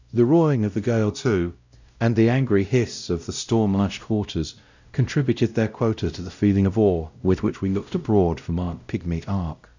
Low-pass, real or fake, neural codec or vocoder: 7.2 kHz; fake; codec, 16 kHz, 0.5 kbps, X-Codec, WavLM features, trained on Multilingual LibriSpeech